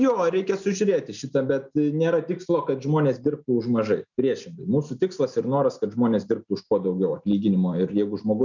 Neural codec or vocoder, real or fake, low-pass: none; real; 7.2 kHz